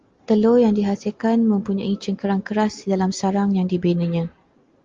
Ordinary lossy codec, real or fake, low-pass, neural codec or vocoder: Opus, 32 kbps; real; 7.2 kHz; none